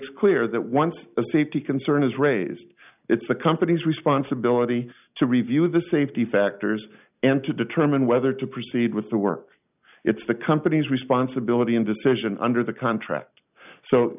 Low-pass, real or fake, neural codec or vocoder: 3.6 kHz; real; none